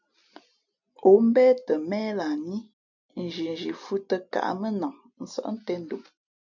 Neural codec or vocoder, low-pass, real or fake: none; 7.2 kHz; real